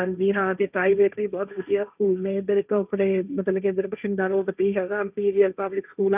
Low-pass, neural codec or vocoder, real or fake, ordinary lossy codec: 3.6 kHz; codec, 16 kHz, 1.1 kbps, Voila-Tokenizer; fake; none